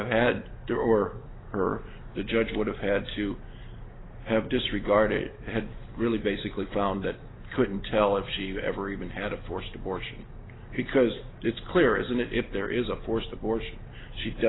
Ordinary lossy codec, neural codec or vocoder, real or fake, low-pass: AAC, 16 kbps; none; real; 7.2 kHz